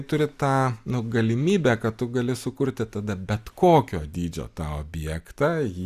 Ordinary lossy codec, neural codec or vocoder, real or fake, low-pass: Opus, 64 kbps; none; real; 14.4 kHz